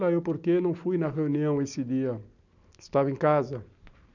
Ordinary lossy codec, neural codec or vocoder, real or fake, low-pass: none; none; real; 7.2 kHz